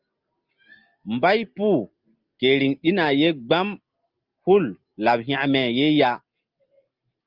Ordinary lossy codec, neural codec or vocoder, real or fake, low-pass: Opus, 32 kbps; none; real; 5.4 kHz